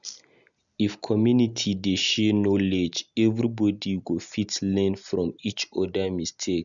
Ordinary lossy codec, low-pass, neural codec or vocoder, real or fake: none; 7.2 kHz; none; real